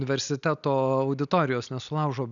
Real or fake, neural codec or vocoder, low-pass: real; none; 7.2 kHz